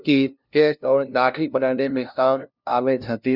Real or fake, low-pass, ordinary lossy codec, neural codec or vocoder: fake; 5.4 kHz; none; codec, 16 kHz, 0.5 kbps, FunCodec, trained on LibriTTS, 25 frames a second